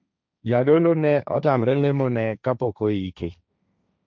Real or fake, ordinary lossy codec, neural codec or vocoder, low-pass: fake; none; codec, 16 kHz, 1.1 kbps, Voila-Tokenizer; none